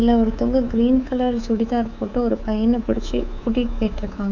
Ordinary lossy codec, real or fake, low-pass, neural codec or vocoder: none; fake; 7.2 kHz; codec, 24 kHz, 3.1 kbps, DualCodec